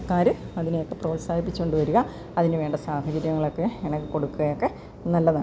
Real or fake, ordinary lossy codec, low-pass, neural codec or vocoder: real; none; none; none